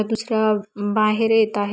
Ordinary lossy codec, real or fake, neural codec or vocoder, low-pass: none; real; none; none